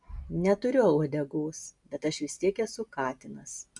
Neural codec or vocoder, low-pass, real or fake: none; 10.8 kHz; real